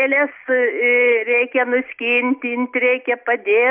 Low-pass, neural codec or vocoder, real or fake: 3.6 kHz; none; real